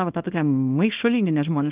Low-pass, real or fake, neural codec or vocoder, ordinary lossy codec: 3.6 kHz; fake; codec, 24 kHz, 0.9 kbps, WavTokenizer, small release; Opus, 32 kbps